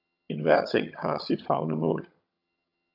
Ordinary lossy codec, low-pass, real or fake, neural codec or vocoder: AAC, 48 kbps; 5.4 kHz; fake; vocoder, 22.05 kHz, 80 mel bands, HiFi-GAN